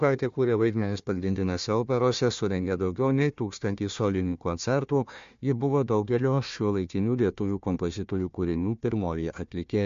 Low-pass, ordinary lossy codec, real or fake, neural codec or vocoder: 7.2 kHz; MP3, 48 kbps; fake; codec, 16 kHz, 1 kbps, FunCodec, trained on Chinese and English, 50 frames a second